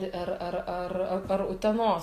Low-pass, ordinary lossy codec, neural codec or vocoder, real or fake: 14.4 kHz; AAC, 48 kbps; none; real